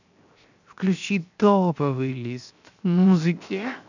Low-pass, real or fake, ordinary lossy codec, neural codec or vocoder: 7.2 kHz; fake; none; codec, 16 kHz, 0.7 kbps, FocalCodec